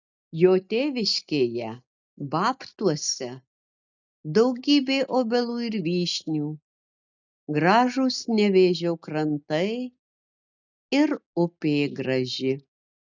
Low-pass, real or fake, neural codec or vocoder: 7.2 kHz; real; none